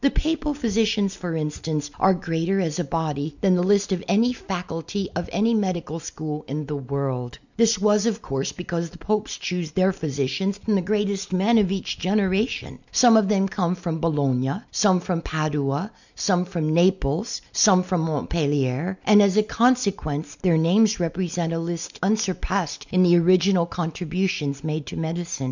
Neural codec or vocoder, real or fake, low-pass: none; real; 7.2 kHz